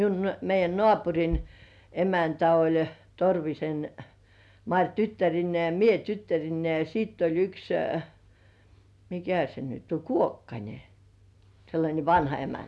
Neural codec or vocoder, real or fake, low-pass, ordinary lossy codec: none; real; none; none